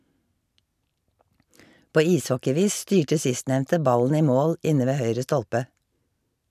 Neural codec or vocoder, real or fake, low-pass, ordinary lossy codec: none; real; 14.4 kHz; none